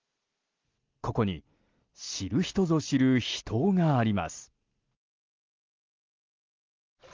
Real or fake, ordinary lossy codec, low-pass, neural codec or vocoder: real; Opus, 32 kbps; 7.2 kHz; none